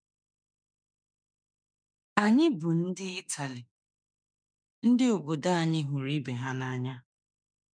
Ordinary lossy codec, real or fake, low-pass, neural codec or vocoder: none; fake; 9.9 kHz; autoencoder, 48 kHz, 32 numbers a frame, DAC-VAE, trained on Japanese speech